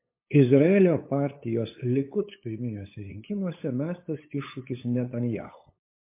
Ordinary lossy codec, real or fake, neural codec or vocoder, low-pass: MP3, 24 kbps; fake; codec, 16 kHz, 8 kbps, FunCodec, trained on LibriTTS, 25 frames a second; 3.6 kHz